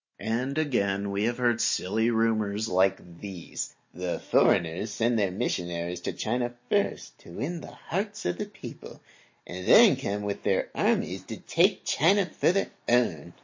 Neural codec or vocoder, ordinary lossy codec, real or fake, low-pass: none; MP3, 32 kbps; real; 7.2 kHz